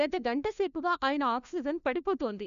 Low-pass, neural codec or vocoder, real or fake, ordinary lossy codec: 7.2 kHz; codec, 16 kHz, 1 kbps, FunCodec, trained on LibriTTS, 50 frames a second; fake; none